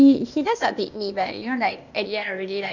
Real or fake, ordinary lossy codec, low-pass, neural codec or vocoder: fake; none; 7.2 kHz; codec, 16 kHz, 0.8 kbps, ZipCodec